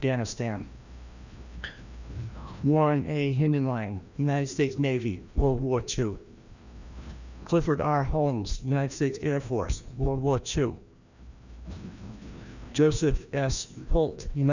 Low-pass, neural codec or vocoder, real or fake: 7.2 kHz; codec, 16 kHz, 1 kbps, FreqCodec, larger model; fake